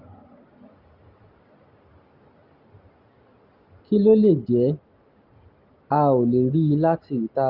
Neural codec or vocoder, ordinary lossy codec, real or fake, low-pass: none; Opus, 32 kbps; real; 5.4 kHz